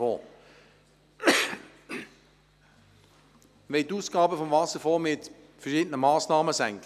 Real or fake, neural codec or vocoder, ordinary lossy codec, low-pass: real; none; none; 14.4 kHz